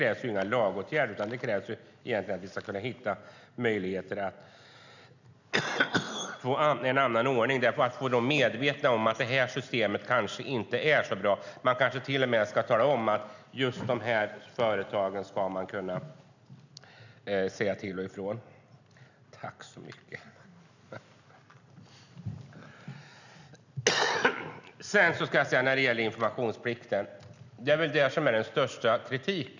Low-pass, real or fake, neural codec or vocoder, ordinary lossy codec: 7.2 kHz; real; none; none